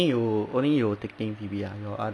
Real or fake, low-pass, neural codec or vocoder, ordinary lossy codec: real; none; none; none